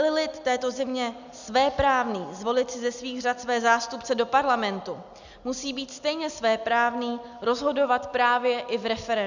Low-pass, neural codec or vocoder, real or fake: 7.2 kHz; none; real